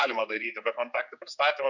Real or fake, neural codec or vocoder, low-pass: fake; codec, 16 kHz, 4 kbps, X-Codec, HuBERT features, trained on general audio; 7.2 kHz